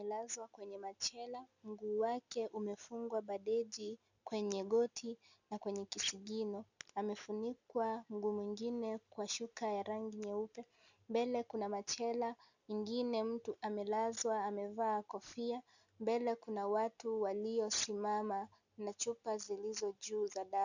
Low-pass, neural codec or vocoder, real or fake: 7.2 kHz; none; real